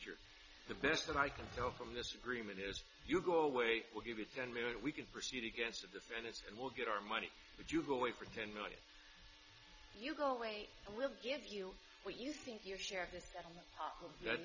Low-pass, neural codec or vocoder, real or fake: 7.2 kHz; none; real